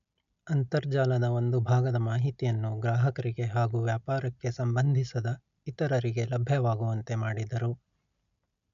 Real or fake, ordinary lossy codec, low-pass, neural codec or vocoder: real; none; 7.2 kHz; none